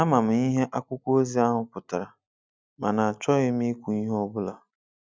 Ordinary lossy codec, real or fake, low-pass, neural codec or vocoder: none; real; none; none